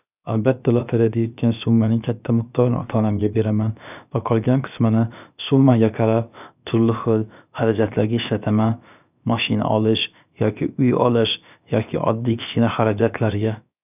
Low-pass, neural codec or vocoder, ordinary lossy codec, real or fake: 3.6 kHz; codec, 16 kHz, about 1 kbps, DyCAST, with the encoder's durations; none; fake